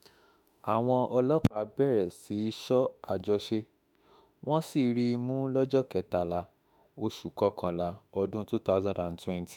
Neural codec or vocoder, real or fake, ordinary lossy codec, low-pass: autoencoder, 48 kHz, 32 numbers a frame, DAC-VAE, trained on Japanese speech; fake; none; 19.8 kHz